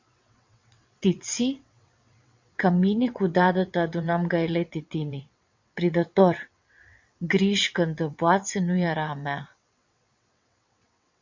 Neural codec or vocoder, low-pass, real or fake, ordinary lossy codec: vocoder, 22.05 kHz, 80 mel bands, WaveNeXt; 7.2 kHz; fake; MP3, 48 kbps